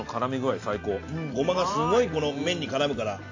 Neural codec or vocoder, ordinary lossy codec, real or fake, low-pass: none; AAC, 48 kbps; real; 7.2 kHz